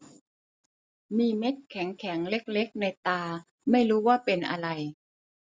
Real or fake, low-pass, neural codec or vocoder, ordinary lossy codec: real; none; none; none